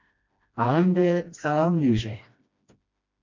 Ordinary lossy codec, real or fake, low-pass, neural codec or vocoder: MP3, 64 kbps; fake; 7.2 kHz; codec, 16 kHz, 1 kbps, FreqCodec, smaller model